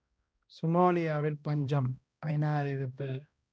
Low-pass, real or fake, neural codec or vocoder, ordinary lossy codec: none; fake; codec, 16 kHz, 1 kbps, X-Codec, HuBERT features, trained on balanced general audio; none